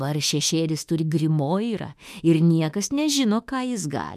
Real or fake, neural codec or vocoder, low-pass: fake; autoencoder, 48 kHz, 32 numbers a frame, DAC-VAE, trained on Japanese speech; 14.4 kHz